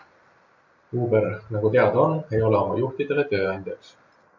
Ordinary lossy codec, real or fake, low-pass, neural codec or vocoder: MP3, 64 kbps; real; 7.2 kHz; none